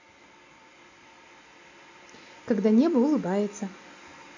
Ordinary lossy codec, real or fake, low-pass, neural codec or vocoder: AAC, 48 kbps; real; 7.2 kHz; none